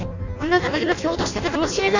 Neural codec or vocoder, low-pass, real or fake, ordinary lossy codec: codec, 16 kHz in and 24 kHz out, 0.6 kbps, FireRedTTS-2 codec; 7.2 kHz; fake; none